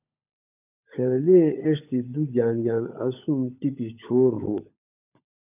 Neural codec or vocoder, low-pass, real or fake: codec, 16 kHz, 16 kbps, FunCodec, trained on LibriTTS, 50 frames a second; 3.6 kHz; fake